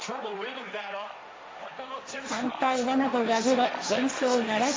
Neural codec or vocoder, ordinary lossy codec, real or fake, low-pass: codec, 16 kHz, 1.1 kbps, Voila-Tokenizer; none; fake; none